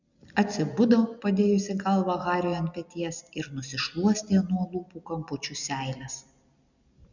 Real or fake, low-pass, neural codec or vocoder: real; 7.2 kHz; none